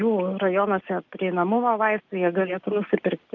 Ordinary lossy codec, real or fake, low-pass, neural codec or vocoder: Opus, 32 kbps; real; 7.2 kHz; none